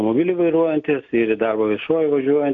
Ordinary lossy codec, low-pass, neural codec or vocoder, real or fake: AAC, 64 kbps; 7.2 kHz; none; real